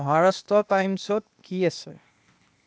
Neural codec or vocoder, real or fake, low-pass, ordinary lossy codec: codec, 16 kHz, 0.8 kbps, ZipCodec; fake; none; none